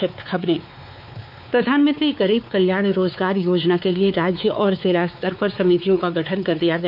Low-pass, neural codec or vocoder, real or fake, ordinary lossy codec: 5.4 kHz; codec, 16 kHz, 4 kbps, X-Codec, WavLM features, trained on Multilingual LibriSpeech; fake; MP3, 48 kbps